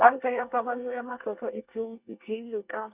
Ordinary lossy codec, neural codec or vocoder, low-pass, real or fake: Opus, 64 kbps; codec, 24 kHz, 1 kbps, SNAC; 3.6 kHz; fake